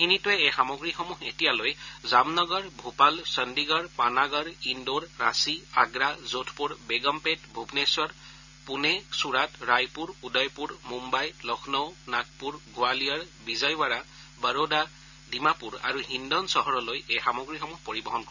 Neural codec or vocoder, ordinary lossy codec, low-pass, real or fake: none; none; 7.2 kHz; real